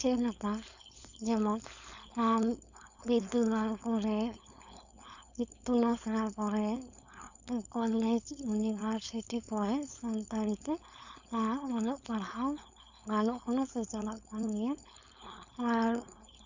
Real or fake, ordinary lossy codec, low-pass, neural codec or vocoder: fake; Opus, 64 kbps; 7.2 kHz; codec, 16 kHz, 4.8 kbps, FACodec